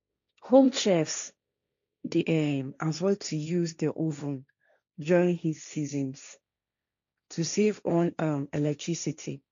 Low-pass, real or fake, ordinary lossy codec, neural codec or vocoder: 7.2 kHz; fake; MP3, 64 kbps; codec, 16 kHz, 1.1 kbps, Voila-Tokenizer